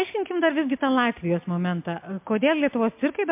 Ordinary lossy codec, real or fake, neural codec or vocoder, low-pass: MP3, 32 kbps; real; none; 3.6 kHz